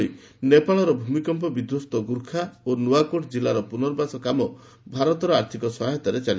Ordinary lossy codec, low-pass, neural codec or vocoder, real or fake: none; none; none; real